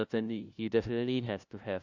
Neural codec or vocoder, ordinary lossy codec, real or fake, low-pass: codec, 16 kHz, 0.5 kbps, FunCodec, trained on LibriTTS, 25 frames a second; Opus, 64 kbps; fake; 7.2 kHz